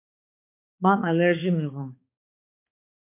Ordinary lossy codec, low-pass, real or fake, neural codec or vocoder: MP3, 24 kbps; 3.6 kHz; fake; codec, 16 kHz, 4 kbps, X-Codec, HuBERT features, trained on balanced general audio